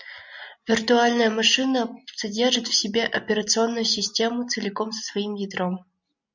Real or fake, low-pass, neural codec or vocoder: real; 7.2 kHz; none